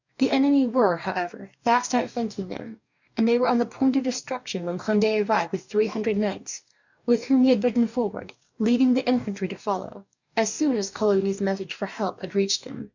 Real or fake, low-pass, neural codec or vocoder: fake; 7.2 kHz; codec, 44.1 kHz, 2.6 kbps, DAC